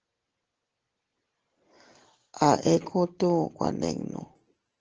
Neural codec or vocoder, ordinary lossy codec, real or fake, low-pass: none; Opus, 16 kbps; real; 7.2 kHz